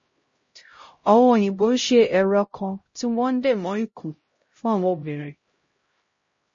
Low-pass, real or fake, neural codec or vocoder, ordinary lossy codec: 7.2 kHz; fake; codec, 16 kHz, 0.5 kbps, X-Codec, HuBERT features, trained on LibriSpeech; MP3, 32 kbps